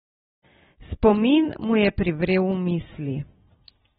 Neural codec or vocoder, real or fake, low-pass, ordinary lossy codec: none; real; 19.8 kHz; AAC, 16 kbps